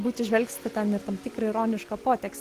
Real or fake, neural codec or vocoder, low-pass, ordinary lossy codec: real; none; 14.4 kHz; Opus, 16 kbps